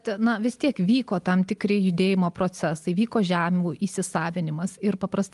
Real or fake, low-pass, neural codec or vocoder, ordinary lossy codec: real; 10.8 kHz; none; Opus, 32 kbps